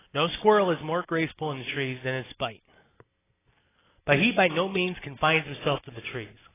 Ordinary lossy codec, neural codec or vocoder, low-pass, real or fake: AAC, 16 kbps; none; 3.6 kHz; real